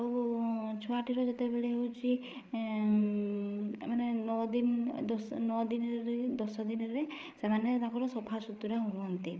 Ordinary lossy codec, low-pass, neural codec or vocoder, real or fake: none; none; codec, 16 kHz, 8 kbps, FreqCodec, larger model; fake